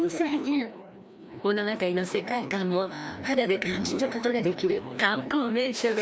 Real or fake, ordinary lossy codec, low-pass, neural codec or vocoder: fake; none; none; codec, 16 kHz, 1 kbps, FreqCodec, larger model